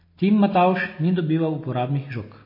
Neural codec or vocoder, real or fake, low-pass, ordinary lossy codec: none; real; 5.4 kHz; MP3, 24 kbps